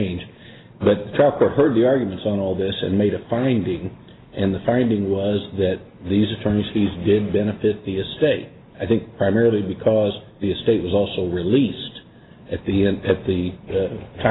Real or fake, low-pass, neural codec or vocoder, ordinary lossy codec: real; 7.2 kHz; none; AAC, 16 kbps